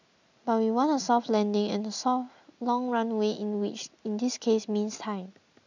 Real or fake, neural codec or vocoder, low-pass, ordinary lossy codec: real; none; 7.2 kHz; none